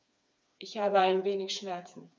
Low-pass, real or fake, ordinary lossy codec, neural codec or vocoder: none; fake; none; codec, 16 kHz, 4 kbps, FreqCodec, smaller model